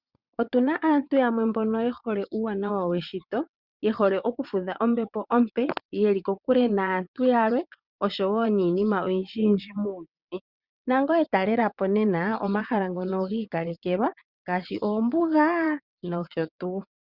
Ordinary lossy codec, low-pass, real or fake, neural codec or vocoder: Opus, 64 kbps; 5.4 kHz; fake; vocoder, 22.05 kHz, 80 mel bands, WaveNeXt